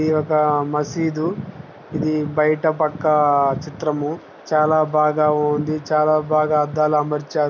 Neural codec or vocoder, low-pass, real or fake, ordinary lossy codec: none; 7.2 kHz; real; none